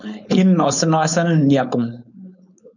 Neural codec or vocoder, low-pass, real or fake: codec, 16 kHz, 4.8 kbps, FACodec; 7.2 kHz; fake